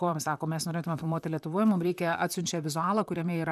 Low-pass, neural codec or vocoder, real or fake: 14.4 kHz; vocoder, 44.1 kHz, 128 mel bands, Pupu-Vocoder; fake